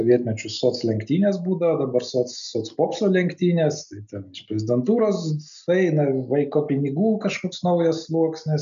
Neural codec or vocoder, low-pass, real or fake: none; 7.2 kHz; real